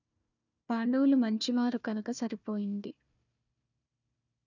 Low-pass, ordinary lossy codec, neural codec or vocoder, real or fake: 7.2 kHz; none; codec, 16 kHz, 1 kbps, FunCodec, trained on Chinese and English, 50 frames a second; fake